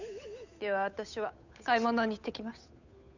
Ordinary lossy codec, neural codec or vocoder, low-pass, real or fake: none; codec, 16 kHz, 8 kbps, FunCodec, trained on Chinese and English, 25 frames a second; 7.2 kHz; fake